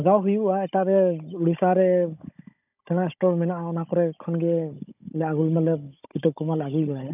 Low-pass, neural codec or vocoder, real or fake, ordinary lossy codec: 3.6 kHz; codec, 16 kHz, 16 kbps, FunCodec, trained on Chinese and English, 50 frames a second; fake; none